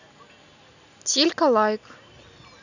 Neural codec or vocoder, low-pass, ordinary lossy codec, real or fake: none; 7.2 kHz; none; real